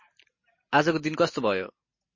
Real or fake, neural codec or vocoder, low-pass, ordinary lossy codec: real; none; 7.2 kHz; MP3, 32 kbps